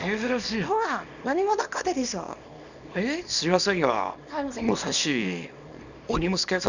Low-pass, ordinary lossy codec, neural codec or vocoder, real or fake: 7.2 kHz; none; codec, 24 kHz, 0.9 kbps, WavTokenizer, small release; fake